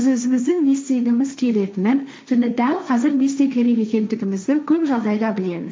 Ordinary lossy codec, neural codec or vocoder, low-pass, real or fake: none; codec, 16 kHz, 1.1 kbps, Voila-Tokenizer; none; fake